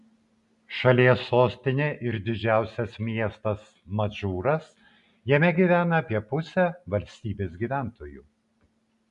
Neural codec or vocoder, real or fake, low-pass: none; real; 10.8 kHz